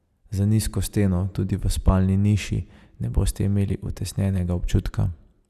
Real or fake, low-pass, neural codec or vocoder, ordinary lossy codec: real; 14.4 kHz; none; Opus, 64 kbps